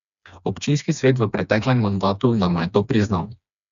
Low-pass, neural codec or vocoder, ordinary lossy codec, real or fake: 7.2 kHz; codec, 16 kHz, 2 kbps, FreqCodec, smaller model; none; fake